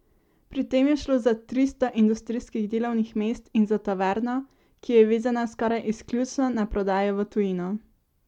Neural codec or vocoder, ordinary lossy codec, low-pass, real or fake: none; MP3, 96 kbps; 19.8 kHz; real